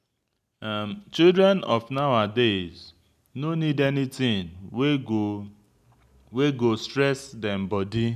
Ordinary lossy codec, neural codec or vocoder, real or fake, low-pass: none; none; real; 14.4 kHz